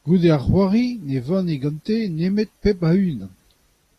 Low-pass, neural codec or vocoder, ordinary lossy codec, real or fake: 14.4 kHz; none; AAC, 48 kbps; real